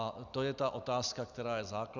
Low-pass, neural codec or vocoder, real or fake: 7.2 kHz; none; real